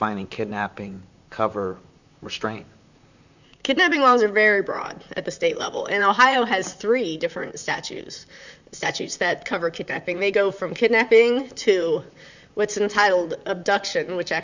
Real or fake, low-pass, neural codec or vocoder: fake; 7.2 kHz; vocoder, 44.1 kHz, 128 mel bands, Pupu-Vocoder